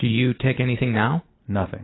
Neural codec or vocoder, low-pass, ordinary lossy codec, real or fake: none; 7.2 kHz; AAC, 16 kbps; real